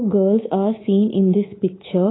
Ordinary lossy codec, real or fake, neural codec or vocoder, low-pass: AAC, 16 kbps; fake; codec, 24 kHz, 3.1 kbps, DualCodec; 7.2 kHz